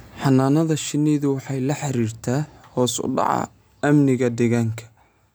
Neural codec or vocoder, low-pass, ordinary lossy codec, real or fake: none; none; none; real